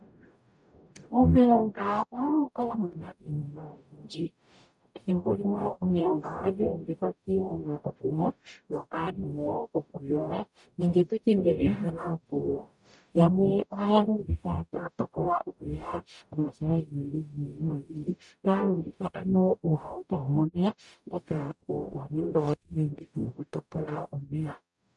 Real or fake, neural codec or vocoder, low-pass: fake; codec, 44.1 kHz, 0.9 kbps, DAC; 10.8 kHz